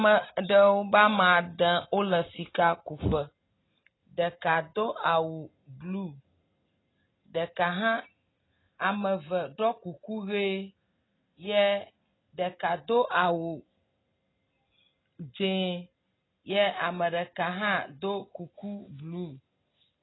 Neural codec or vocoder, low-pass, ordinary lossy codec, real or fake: none; 7.2 kHz; AAC, 16 kbps; real